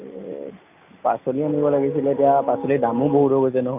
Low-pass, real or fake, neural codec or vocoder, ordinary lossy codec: 3.6 kHz; real; none; none